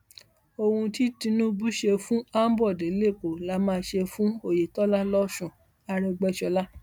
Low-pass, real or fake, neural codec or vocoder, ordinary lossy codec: 19.8 kHz; real; none; none